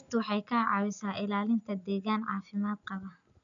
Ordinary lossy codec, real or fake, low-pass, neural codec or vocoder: none; real; 7.2 kHz; none